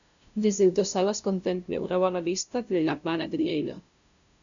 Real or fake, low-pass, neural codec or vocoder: fake; 7.2 kHz; codec, 16 kHz, 0.5 kbps, FunCodec, trained on LibriTTS, 25 frames a second